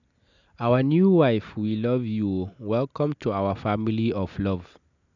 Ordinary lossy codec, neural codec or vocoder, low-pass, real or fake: none; none; 7.2 kHz; real